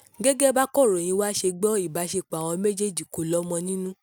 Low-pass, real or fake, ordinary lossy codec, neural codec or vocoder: none; real; none; none